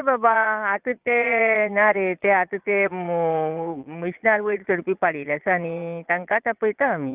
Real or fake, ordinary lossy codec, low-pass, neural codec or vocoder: fake; Opus, 24 kbps; 3.6 kHz; vocoder, 22.05 kHz, 80 mel bands, Vocos